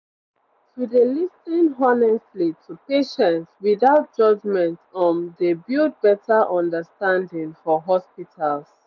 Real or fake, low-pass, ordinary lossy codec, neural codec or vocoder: real; 7.2 kHz; none; none